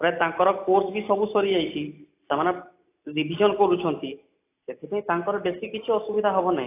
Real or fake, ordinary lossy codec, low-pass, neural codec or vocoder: real; AAC, 24 kbps; 3.6 kHz; none